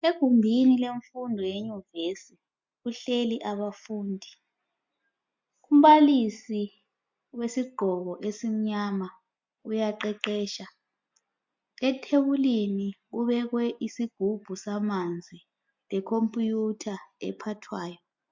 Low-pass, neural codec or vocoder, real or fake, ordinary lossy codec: 7.2 kHz; none; real; MP3, 64 kbps